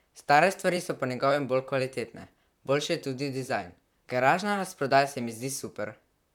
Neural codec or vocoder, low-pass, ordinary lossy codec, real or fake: vocoder, 44.1 kHz, 128 mel bands, Pupu-Vocoder; 19.8 kHz; none; fake